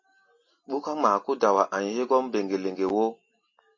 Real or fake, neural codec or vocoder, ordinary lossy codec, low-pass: real; none; MP3, 32 kbps; 7.2 kHz